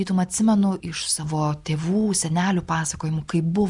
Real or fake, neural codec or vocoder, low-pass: real; none; 10.8 kHz